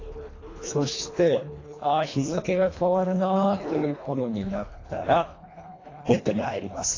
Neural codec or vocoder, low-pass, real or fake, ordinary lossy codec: codec, 24 kHz, 1.5 kbps, HILCodec; 7.2 kHz; fake; AAC, 32 kbps